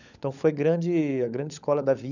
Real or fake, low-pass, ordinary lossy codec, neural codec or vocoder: fake; 7.2 kHz; none; codec, 16 kHz, 16 kbps, FunCodec, trained on LibriTTS, 50 frames a second